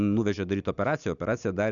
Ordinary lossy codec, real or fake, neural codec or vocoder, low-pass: MP3, 96 kbps; real; none; 7.2 kHz